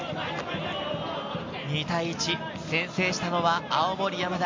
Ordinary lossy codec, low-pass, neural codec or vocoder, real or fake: MP3, 48 kbps; 7.2 kHz; none; real